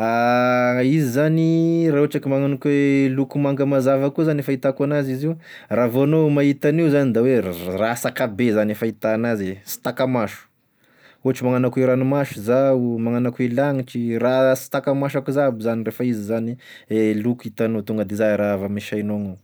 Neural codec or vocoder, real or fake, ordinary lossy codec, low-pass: none; real; none; none